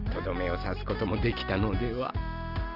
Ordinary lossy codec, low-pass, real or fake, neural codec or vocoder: Opus, 64 kbps; 5.4 kHz; real; none